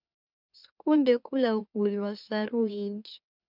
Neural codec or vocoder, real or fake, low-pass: autoencoder, 44.1 kHz, a latent of 192 numbers a frame, MeloTTS; fake; 5.4 kHz